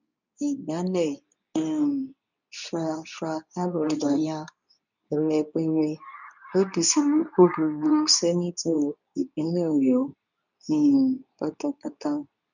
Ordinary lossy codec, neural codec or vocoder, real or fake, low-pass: none; codec, 24 kHz, 0.9 kbps, WavTokenizer, medium speech release version 2; fake; 7.2 kHz